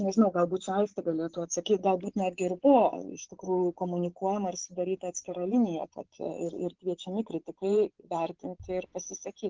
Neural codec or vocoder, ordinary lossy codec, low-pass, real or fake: vocoder, 24 kHz, 100 mel bands, Vocos; Opus, 16 kbps; 7.2 kHz; fake